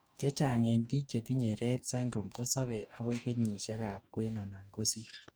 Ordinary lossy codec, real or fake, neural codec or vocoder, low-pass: none; fake; codec, 44.1 kHz, 2.6 kbps, DAC; none